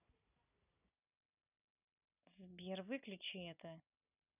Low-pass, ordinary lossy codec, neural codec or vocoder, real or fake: 3.6 kHz; none; none; real